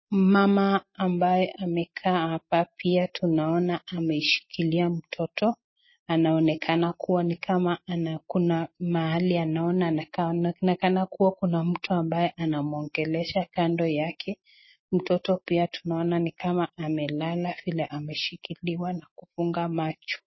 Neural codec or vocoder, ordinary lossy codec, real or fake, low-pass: none; MP3, 24 kbps; real; 7.2 kHz